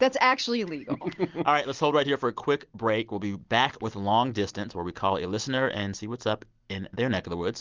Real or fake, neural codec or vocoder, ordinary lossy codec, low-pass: real; none; Opus, 32 kbps; 7.2 kHz